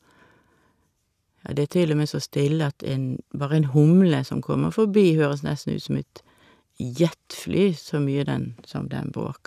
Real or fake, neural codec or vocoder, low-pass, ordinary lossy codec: real; none; 14.4 kHz; none